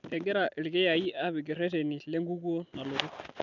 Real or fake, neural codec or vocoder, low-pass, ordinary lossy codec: real; none; 7.2 kHz; none